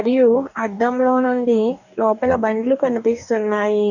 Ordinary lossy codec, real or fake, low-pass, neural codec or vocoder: none; fake; 7.2 kHz; codec, 44.1 kHz, 2.6 kbps, DAC